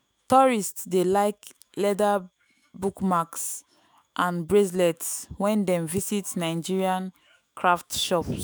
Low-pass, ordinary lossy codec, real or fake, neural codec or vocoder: none; none; fake; autoencoder, 48 kHz, 128 numbers a frame, DAC-VAE, trained on Japanese speech